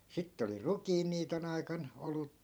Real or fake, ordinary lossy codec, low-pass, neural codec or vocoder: fake; none; none; vocoder, 44.1 kHz, 128 mel bands every 512 samples, BigVGAN v2